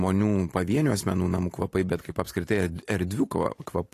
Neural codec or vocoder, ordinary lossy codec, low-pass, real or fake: vocoder, 44.1 kHz, 128 mel bands every 512 samples, BigVGAN v2; AAC, 48 kbps; 14.4 kHz; fake